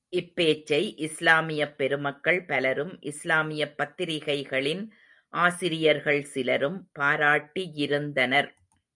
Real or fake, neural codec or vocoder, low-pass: real; none; 10.8 kHz